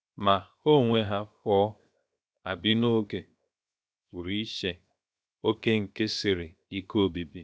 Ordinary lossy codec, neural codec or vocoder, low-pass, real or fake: none; codec, 16 kHz, 0.7 kbps, FocalCodec; none; fake